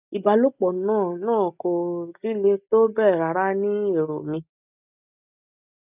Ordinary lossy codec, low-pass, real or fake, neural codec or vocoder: none; 3.6 kHz; real; none